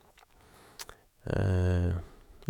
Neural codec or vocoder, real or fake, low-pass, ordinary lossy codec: autoencoder, 48 kHz, 128 numbers a frame, DAC-VAE, trained on Japanese speech; fake; 19.8 kHz; none